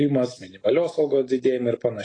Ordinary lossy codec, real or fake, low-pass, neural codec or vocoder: AAC, 32 kbps; real; 9.9 kHz; none